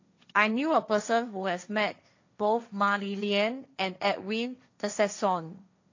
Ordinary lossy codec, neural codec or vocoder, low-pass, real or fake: AAC, 48 kbps; codec, 16 kHz, 1.1 kbps, Voila-Tokenizer; 7.2 kHz; fake